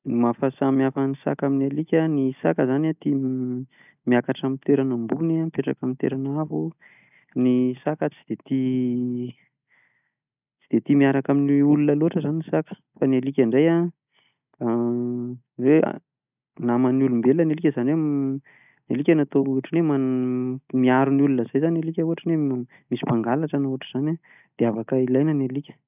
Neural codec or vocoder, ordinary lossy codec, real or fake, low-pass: none; none; real; 3.6 kHz